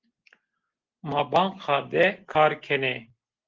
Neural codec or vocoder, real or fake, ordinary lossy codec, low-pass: none; real; Opus, 16 kbps; 7.2 kHz